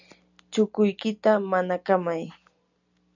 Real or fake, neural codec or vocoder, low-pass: real; none; 7.2 kHz